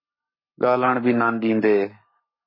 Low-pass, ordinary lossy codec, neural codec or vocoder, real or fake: 5.4 kHz; MP3, 24 kbps; codec, 44.1 kHz, 7.8 kbps, Pupu-Codec; fake